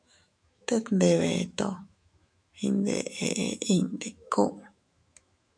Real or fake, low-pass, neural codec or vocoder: fake; 9.9 kHz; autoencoder, 48 kHz, 128 numbers a frame, DAC-VAE, trained on Japanese speech